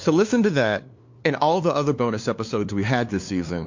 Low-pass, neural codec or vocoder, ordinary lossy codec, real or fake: 7.2 kHz; codec, 16 kHz, 2 kbps, FunCodec, trained on LibriTTS, 25 frames a second; MP3, 48 kbps; fake